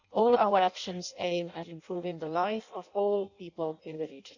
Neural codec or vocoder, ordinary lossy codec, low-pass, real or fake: codec, 16 kHz in and 24 kHz out, 0.6 kbps, FireRedTTS-2 codec; none; 7.2 kHz; fake